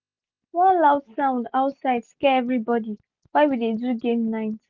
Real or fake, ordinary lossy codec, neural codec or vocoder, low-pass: real; Opus, 24 kbps; none; 7.2 kHz